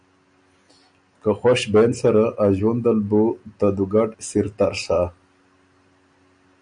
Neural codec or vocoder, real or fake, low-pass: none; real; 9.9 kHz